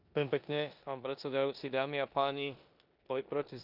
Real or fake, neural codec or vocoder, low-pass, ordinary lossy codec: fake; codec, 16 kHz in and 24 kHz out, 0.9 kbps, LongCat-Audio-Codec, four codebook decoder; 5.4 kHz; none